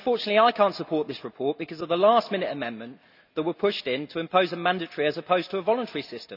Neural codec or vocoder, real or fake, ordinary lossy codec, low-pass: none; real; none; 5.4 kHz